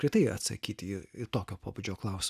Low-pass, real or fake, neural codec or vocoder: 14.4 kHz; real; none